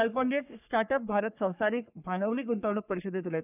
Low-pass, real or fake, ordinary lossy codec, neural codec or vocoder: 3.6 kHz; fake; none; codec, 44.1 kHz, 3.4 kbps, Pupu-Codec